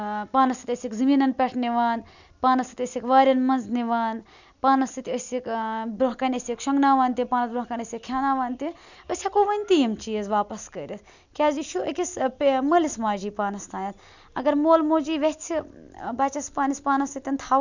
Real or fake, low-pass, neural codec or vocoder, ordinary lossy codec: real; 7.2 kHz; none; none